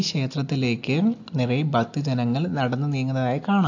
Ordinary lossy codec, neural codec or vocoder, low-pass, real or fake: AAC, 48 kbps; none; 7.2 kHz; real